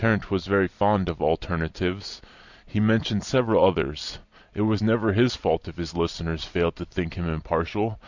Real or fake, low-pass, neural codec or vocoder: fake; 7.2 kHz; vocoder, 44.1 kHz, 128 mel bands every 256 samples, BigVGAN v2